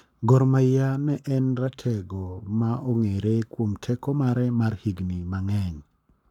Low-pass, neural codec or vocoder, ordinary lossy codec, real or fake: 19.8 kHz; codec, 44.1 kHz, 7.8 kbps, Pupu-Codec; none; fake